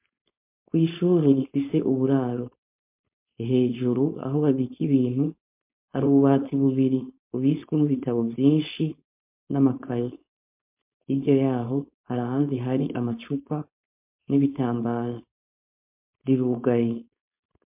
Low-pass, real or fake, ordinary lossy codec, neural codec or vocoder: 3.6 kHz; fake; MP3, 32 kbps; codec, 16 kHz, 4.8 kbps, FACodec